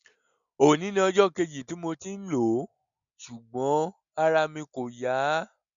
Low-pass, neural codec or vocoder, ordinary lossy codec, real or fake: 7.2 kHz; none; none; real